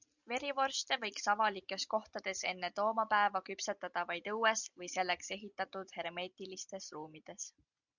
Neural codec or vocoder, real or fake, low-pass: none; real; 7.2 kHz